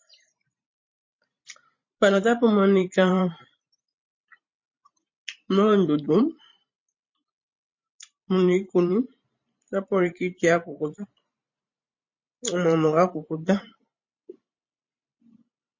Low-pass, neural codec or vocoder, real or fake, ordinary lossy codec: 7.2 kHz; vocoder, 44.1 kHz, 128 mel bands every 512 samples, BigVGAN v2; fake; MP3, 32 kbps